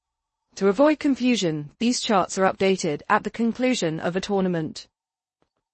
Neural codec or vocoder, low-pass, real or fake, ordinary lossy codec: codec, 16 kHz in and 24 kHz out, 0.6 kbps, FocalCodec, streaming, 2048 codes; 10.8 kHz; fake; MP3, 32 kbps